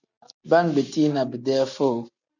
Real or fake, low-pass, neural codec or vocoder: real; 7.2 kHz; none